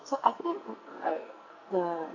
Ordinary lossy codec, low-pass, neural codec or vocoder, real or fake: AAC, 48 kbps; 7.2 kHz; codec, 44.1 kHz, 2.6 kbps, SNAC; fake